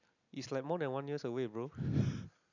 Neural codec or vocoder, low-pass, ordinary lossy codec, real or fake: none; 7.2 kHz; none; real